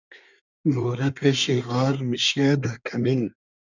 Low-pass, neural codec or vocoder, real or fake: 7.2 kHz; codec, 24 kHz, 1 kbps, SNAC; fake